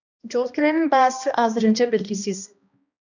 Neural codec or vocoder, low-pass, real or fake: codec, 16 kHz, 1 kbps, X-Codec, HuBERT features, trained on balanced general audio; 7.2 kHz; fake